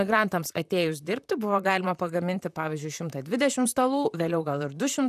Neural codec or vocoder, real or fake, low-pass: vocoder, 44.1 kHz, 128 mel bands, Pupu-Vocoder; fake; 14.4 kHz